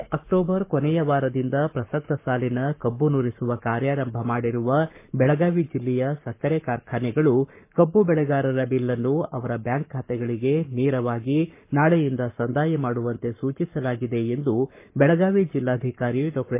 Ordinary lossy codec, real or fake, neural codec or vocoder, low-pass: MP3, 24 kbps; fake; codec, 44.1 kHz, 7.8 kbps, Pupu-Codec; 3.6 kHz